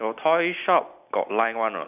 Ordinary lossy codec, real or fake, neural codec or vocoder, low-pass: none; real; none; 3.6 kHz